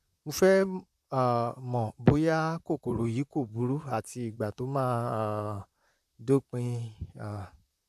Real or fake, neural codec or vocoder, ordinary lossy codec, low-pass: fake; vocoder, 44.1 kHz, 128 mel bands, Pupu-Vocoder; none; 14.4 kHz